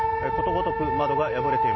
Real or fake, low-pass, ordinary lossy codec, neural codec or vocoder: real; 7.2 kHz; MP3, 24 kbps; none